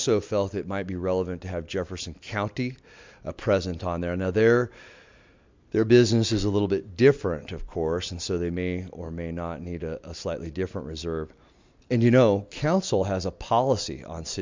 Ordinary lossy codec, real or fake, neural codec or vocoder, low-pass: MP3, 64 kbps; real; none; 7.2 kHz